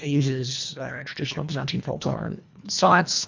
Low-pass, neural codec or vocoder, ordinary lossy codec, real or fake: 7.2 kHz; codec, 24 kHz, 1.5 kbps, HILCodec; MP3, 64 kbps; fake